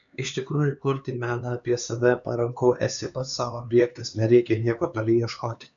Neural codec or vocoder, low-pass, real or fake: codec, 16 kHz, 4 kbps, X-Codec, HuBERT features, trained on LibriSpeech; 7.2 kHz; fake